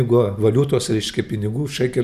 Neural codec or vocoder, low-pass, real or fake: none; 14.4 kHz; real